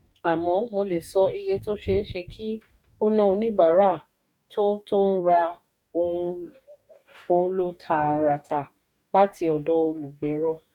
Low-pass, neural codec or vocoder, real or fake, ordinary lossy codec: 19.8 kHz; codec, 44.1 kHz, 2.6 kbps, DAC; fake; none